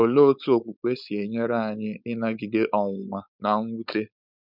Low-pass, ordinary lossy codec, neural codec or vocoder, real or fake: 5.4 kHz; none; codec, 16 kHz, 4.8 kbps, FACodec; fake